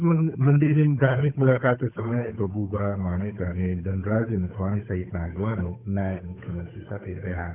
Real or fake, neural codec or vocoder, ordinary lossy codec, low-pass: fake; codec, 16 kHz, 8 kbps, FunCodec, trained on Chinese and English, 25 frames a second; none; 3.6 kHz